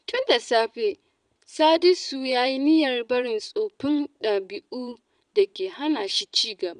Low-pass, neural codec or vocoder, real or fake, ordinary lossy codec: 9.9 kHz; vocoder, 22.05 kHz, 80 mel bands, Vocos; fake; none